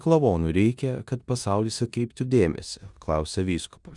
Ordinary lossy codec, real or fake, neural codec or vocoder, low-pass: Opus, 64 kbps; fake; codec, 16 kHz in and 24 kHz out, 0.9 kbps, LongCat-Audio-Codec, four codebook decoder; 10.8 kHz